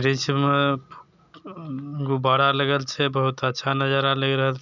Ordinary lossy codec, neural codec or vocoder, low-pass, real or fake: none; none; 7.2 kHz; real